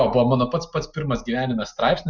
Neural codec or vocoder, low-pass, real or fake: none; 7.2 kHz; real